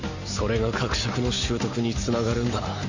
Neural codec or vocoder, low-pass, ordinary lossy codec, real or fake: none; 7.2 kHz; Opus, 64 kbps; real